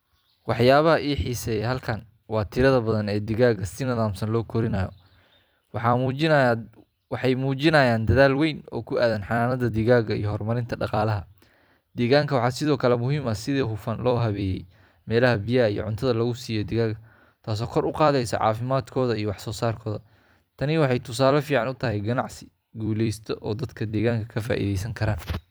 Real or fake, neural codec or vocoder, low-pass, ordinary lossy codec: fake; vocoder, 44.1 kHz, 128 mel bands every 256 samples, BigVGAN v2; none; none